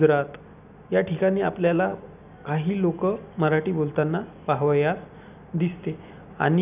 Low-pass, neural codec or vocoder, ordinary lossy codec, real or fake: 3.6 kHz; none; none; real